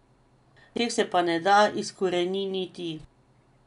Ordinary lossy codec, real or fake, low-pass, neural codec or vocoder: none; real; 10.8 kHz; none